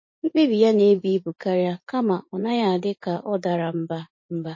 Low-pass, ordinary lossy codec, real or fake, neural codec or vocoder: 7.2 kHz; MP3, 32 kbps; fake; vocoder, 44.1 kHz, 80 mel bands, Vocos